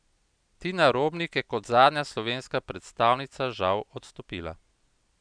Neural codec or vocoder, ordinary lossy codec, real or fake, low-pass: none; none; real; 9.9 kHz